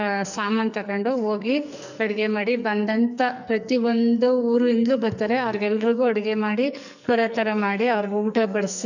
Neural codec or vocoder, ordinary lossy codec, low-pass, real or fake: codec, 44.1 kHz, 2.6 kbps, SNAC; none; 7.2 kHz; fake